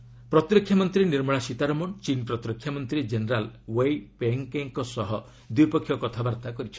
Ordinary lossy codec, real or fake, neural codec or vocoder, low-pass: none; real; none; none